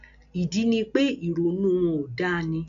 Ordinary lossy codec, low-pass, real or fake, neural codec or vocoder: none; 7.2 kHz; real; none